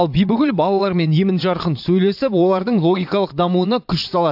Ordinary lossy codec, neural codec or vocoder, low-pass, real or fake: AAC, 48 kbps; vocoder, 22.05 kHz, 80 mel bands, Vocos; 5.4 kHz; fake